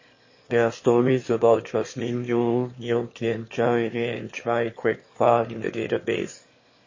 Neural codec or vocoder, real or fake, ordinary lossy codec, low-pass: autoencoder, 22.05 kHz, a latent of 192 numbers a frame, VITS, trained on one speaker; fake; MP3, 32 kbps; 7.2 kHz